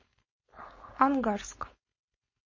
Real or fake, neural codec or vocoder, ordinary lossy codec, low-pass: fake; codec, 16 kHz, 4.8 kbps, FACodec; MP3, 32 kbps; 7.2 kHz